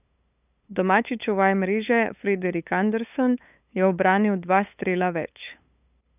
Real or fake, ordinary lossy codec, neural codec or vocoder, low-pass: fake; none; codec, 16 kHz, 8 kbps, FunCodec, trained on LibriTTS, 25 frames a second; 3.6 kHz